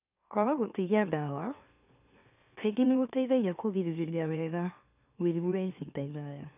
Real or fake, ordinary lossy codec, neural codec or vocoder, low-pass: fake; none; autoencoder, 44.1 kHz, a latent of 192 numbers a frame, MeloTTS; 3.6 kHz